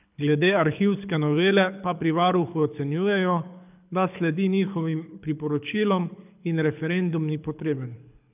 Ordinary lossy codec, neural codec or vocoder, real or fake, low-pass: none; codec, 24 kHz, 6 kbps, HILCodec; fake; 3.6 kHz